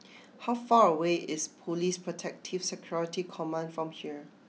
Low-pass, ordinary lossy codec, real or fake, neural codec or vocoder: none; none; real; none